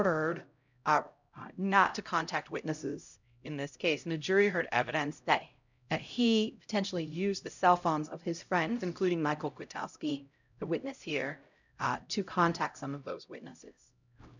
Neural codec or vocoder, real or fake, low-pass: codec, 16 kHz, 0.5 kbps, X-Codec, HuBERT features, trained on LibriSpeech; fake; 7.2 kHz